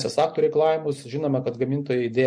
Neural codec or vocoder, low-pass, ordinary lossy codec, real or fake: none; 9.9 kHz; MP3, 48 kbps; real